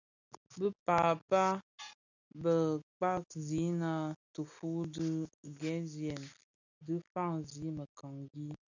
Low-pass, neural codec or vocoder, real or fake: 7.2 kHz; none; real